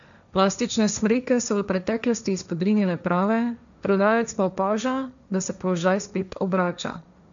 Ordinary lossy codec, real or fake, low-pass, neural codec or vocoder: none; fake; 7.2 kHz; codec, 16 kHz, 1.1 kbps, Voila-Tokenizer